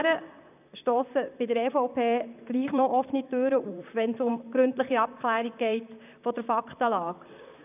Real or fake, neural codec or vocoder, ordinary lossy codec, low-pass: fake; vocoder, 44.1 kHz, 128 mel bands, Pupu-Vocoder; none; 3.6 kHz